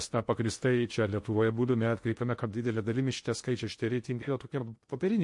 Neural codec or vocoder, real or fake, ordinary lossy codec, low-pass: codec, 16 kHz in and 24 kHz out, 0.6 kbps, FocalCodec, streaming, 2048 codes; fake; MP3, 48 kbps; 10.8 kHz